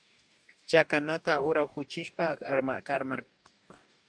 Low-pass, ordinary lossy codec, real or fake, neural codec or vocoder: 9.9 kHz; MP3, 96 kbps; fake; codec, 44.1 kHz, 2.6 kbps, DAC